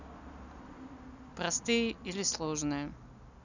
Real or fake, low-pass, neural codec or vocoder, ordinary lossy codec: real; 7.2 kHz; none; none